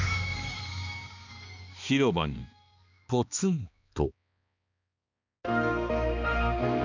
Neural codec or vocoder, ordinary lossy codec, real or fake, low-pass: codec, 16 kHz, 2 kbps, X-Codec, HuBERT features, trained on balanced general audio; none; fake; 7.2 kHz